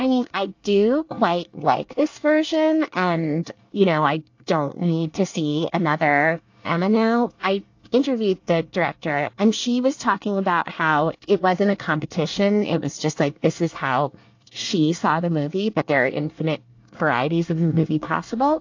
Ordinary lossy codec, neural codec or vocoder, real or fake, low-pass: AAC, 48 kbps; codec, 24 kHz, 1 kbps, SNAC; fake; 7.2 kHz